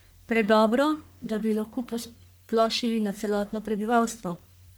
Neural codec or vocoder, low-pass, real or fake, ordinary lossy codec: codec, 44.1 kHz, 1.7 kbps, Pupu-Codec; none; fake; none